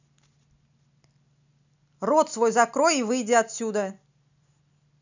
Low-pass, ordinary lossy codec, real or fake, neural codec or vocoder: 7.2 kHz; none; real; none